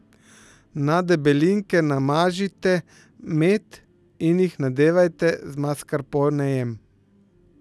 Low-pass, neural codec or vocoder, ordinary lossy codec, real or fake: none; none; none; real